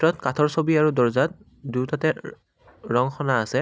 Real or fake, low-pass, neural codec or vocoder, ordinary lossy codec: real; none; none; none